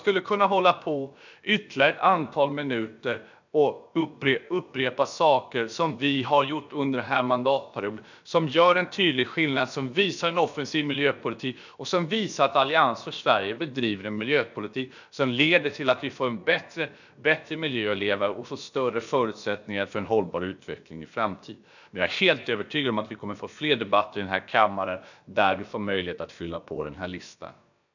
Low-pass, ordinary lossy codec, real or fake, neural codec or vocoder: 7.2 kHz; none; fake; codec, 16 kHz, about 1 kbps, DyCAST, with the encoder's durations